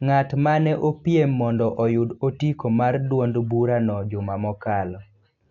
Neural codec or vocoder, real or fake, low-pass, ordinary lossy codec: none; real; 7.2 kHz; AAC, 48 kbps